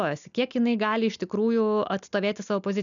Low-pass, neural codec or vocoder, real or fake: 7.2 kHz; none; real